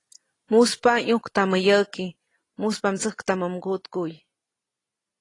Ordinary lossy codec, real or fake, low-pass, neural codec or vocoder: AAC, 32 kbps; real; 10.8 kHz; none